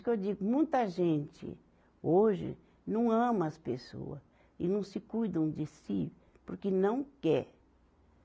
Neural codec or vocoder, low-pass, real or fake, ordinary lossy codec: none; none; real; none